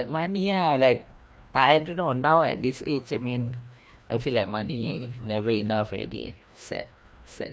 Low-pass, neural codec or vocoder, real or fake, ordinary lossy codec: none; codec, 16 kHz, 1 kbps, FreqCodec, larger model; fake; none